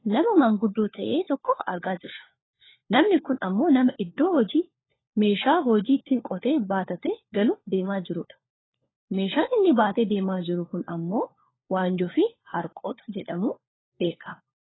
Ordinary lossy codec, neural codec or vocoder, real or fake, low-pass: AAC, 16 kbps; codec, 16 kHz, 4 kbps, FunCodec, trained on LibriTTS, 50 frames a second; fake; 7.2 kHz